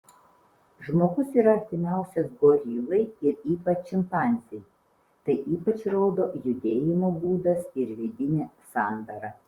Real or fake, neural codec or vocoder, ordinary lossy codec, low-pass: fake; vocoder, 44.1 kHz, 128 mel bands, Pupu-Vocoder; Opus, 64 kbps; 19.8 kHz